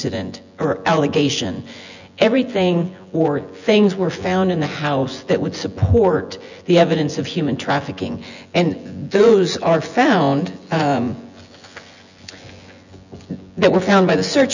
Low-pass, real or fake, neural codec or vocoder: 7.2 kHz; fake; vocoder, 24 kHz, 100 mel bands, Vocos